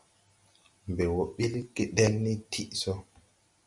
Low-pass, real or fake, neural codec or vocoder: 10.8 kHz; real; none